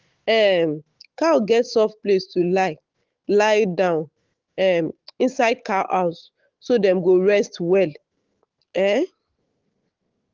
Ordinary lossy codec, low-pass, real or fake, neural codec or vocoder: Opus, 16 kbps; 7.2 kHz; fake; autoencoder, 48 kHz, 128 numbers a frame, DAC-VAE, trained on Japanese speech